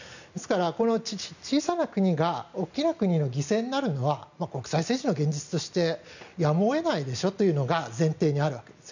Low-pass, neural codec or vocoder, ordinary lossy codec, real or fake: 7.2 kHz; none; none; real